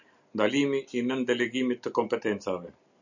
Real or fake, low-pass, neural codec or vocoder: real; 7.2 kHz; none